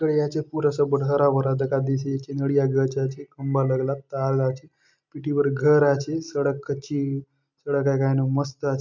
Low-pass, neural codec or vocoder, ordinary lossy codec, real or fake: 7.2 kHz; none; MP3, 64 kbps; real